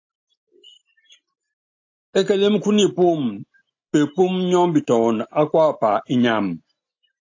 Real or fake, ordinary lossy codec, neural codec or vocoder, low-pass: real; AAC, 48 kbps; none; 7.2 kHz